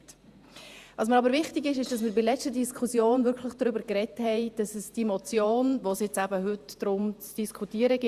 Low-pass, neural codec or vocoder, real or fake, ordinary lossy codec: 14.4 kHz; vocoder, 48 kHz, 128 mel bands, Vocos; fake; Opus, 64 kbps